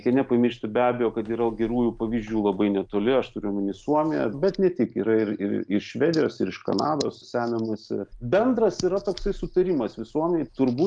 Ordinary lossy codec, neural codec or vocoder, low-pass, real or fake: Opus, 32 kbps; none; 9.9 kHz; real